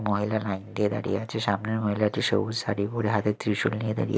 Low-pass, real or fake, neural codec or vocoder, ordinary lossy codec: none; real; none; none